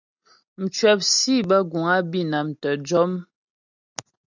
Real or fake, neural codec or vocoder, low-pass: real; none; 7.2 kHz